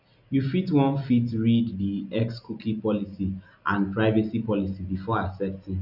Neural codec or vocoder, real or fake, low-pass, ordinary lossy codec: none; real; 5.4 kHz; none